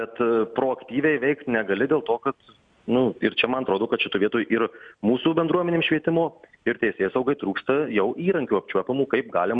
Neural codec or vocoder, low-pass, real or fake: none; 9.9 kHz; real